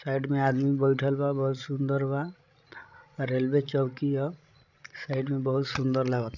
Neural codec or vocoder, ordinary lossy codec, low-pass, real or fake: codec, 16 kHz, 16 kbps, FreqCodec, larger model; none; 7.2 kHz; fake